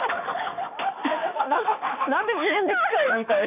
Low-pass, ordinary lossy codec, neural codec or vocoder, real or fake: 3.6 kHz; none; autoencoder, 48 kHz, 32 numbers a frame, DAC-VAE, trained on Japanese speech; fake